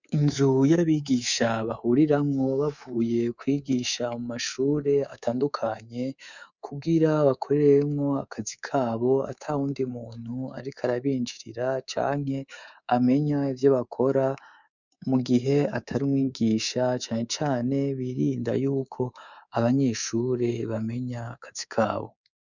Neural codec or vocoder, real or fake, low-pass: codec, 24 kHz, 3.1 kbps, DualCodec; fake; 7.2 kHz